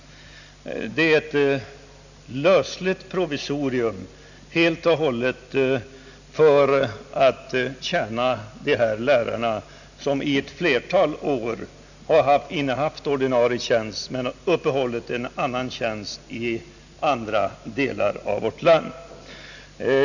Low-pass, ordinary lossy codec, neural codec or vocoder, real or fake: 7.2 kHz; none; none; real